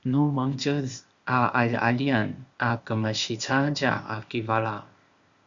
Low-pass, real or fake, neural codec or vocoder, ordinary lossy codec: 7.2 kHz; fake; codec, 16 kHz, 0.8 kbps, ZipCodec; Opus, 64 kbps